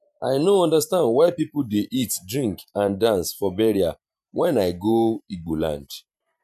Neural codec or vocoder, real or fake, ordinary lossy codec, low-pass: vocoder, 44.1 kHz, 128 mel bands every 512 samples, BigVGAN v2; fake; none; 14.4 kHz